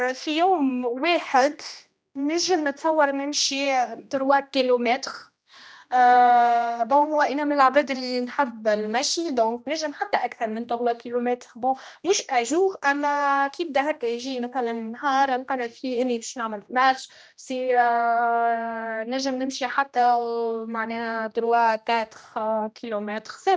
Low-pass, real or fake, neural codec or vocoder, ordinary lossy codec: none; fake; codec, 16 kHz, 1 kbps, X-Codec, HuBERT features, trained on general audio; none